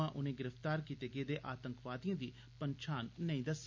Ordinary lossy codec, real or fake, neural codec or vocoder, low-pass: MP3, 48 kbps; real; none; 7.2 kHz